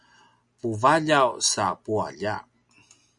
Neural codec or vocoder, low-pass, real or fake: none; 10.8 kHz; real